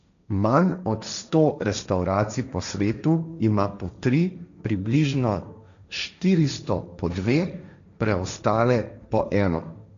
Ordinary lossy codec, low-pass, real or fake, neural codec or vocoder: none; 7.2 kHz; fake; codec, 16 kHz, 1.1 kbps, Voila-Tokenizer